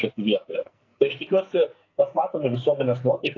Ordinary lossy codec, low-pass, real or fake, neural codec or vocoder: AAC, 32 kbps; 7.2 kHz; fake; codec, 44.1 kHz, 2.6 kbps, SNAC